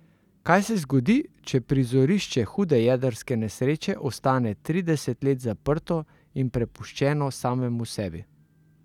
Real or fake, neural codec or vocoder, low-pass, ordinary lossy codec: real; none; 19.8 kHz; none